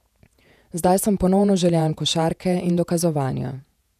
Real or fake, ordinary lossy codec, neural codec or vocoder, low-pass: fake; none; vocoder, 48 kHz, 128 mel bands, Vocos; 14.4 kHz